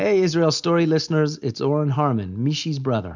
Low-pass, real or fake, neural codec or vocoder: 7.2 kHz; real; none